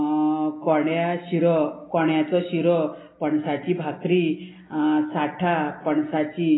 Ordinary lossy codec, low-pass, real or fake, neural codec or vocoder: AAC, 16 kbps; 7.2 kHz; real; none